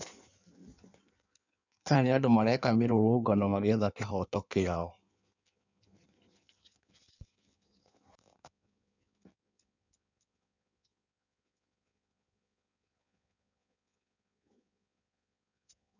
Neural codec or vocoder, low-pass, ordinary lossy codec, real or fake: codec, 16 kHz in and 24 kHz out, 1.1 kbps, FireRedTTS-2 codec; 7.2 kHz; none; fake